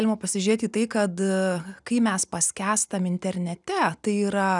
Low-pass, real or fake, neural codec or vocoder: 10.8 kHz; real; none